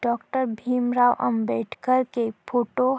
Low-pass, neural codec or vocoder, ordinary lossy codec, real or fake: none; none; none; real